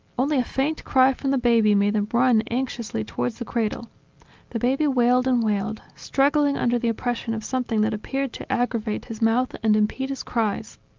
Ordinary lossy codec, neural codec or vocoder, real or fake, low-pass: Opus, 24 kbps; none; real; 7.2 kHz